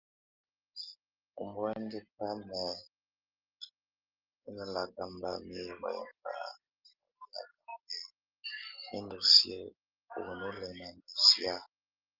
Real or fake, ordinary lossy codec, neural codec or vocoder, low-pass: real; Opus, 32 kbps; none; 5.4 kHz